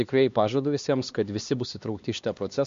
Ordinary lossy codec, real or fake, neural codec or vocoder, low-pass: MP3, 48 kbps; fake; codec, 16 kHz, 2 kbps, X-Codec, HuBERT features, trained on LibriSpeech; 7.2 kHz